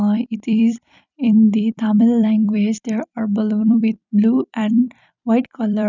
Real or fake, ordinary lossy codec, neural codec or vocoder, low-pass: fake; none; vocoder, 44.1 kHz, 128 mel bands every 256 samples, BigVGAN v2; 7.2 kHz